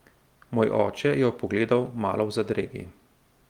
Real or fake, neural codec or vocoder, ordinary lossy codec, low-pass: real; none; Opus, 24 kbps; 19.8 kHz